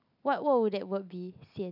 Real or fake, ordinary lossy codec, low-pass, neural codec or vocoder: real; none; 5.4 kHz; none